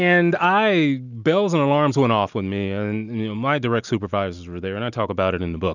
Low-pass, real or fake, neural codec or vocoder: 7.2 kHz; real; none